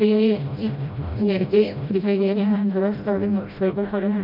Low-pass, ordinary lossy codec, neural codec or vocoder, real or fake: 5.4 kHz; none; codec, 16 kHz, 0.5 kbps, FreqCodec, smaller model; fake